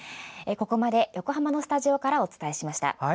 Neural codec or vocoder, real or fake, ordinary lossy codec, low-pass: none; real; none; none